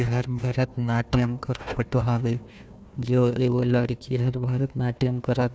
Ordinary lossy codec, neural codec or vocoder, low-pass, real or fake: none; codec, 16 kHz, 1 kbps, FunCodec, trained on Chinese and English, 50 frames a second; none; fake